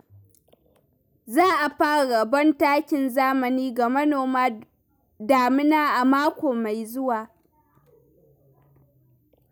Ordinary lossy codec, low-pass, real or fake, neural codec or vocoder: none; none; real; none